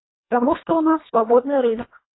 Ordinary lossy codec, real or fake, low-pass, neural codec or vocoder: AAC, 16 kbps; fake; 7.2 kHz; codec, 24 kHz, 1.5 kbps, HILCodec